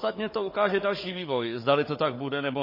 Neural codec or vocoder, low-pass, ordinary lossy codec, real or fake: codec, 16 kHz, 16 kbps, FunCodec, trained on Chinese and English, 50 frames a second; 5.4 kHz; MP3, 24 kbps; fake